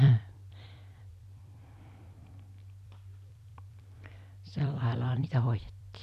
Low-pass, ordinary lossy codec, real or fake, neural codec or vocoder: 14.4 kHz; none; fake; vocoder, 44.1 kHz, 128 mel bands every 256 samples, BigVGAN v2